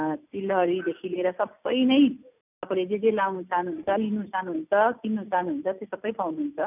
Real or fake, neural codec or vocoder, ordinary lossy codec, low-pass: real; none; none; 3.6 kHz